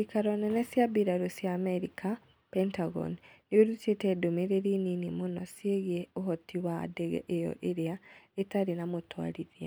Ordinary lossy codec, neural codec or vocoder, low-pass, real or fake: none; none; none; real